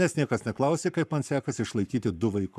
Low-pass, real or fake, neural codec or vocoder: 14.4 kHz; fake; codec, 44.1 kHz, 7.8 kbps, Pupu-Codec